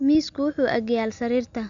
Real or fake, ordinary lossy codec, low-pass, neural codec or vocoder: real; none; 7.2 kHz; none